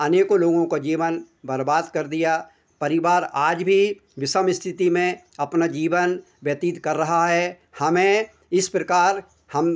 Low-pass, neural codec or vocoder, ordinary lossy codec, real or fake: none; none; none; real